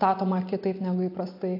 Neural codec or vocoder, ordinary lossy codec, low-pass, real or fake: none; AAC, 32 kbps; 5.4 kHz; real